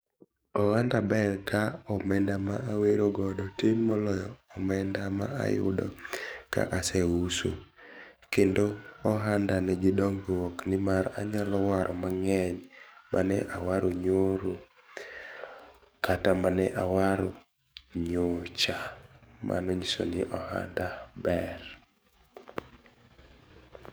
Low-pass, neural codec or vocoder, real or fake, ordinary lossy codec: none; codec, 44.1 kHz, 7.8 kbps, DAC; fake; none